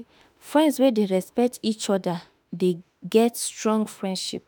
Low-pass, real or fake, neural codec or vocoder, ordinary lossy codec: none; fake; autoencoder, 48 kHz, 32 numbers a frame, DAC-VAE, trained on Japanese speech; none